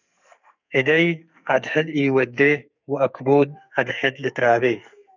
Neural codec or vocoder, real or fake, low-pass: codec, 44.1 kHz, 2.6 kbps, SNAC; fake; 7.2 kHz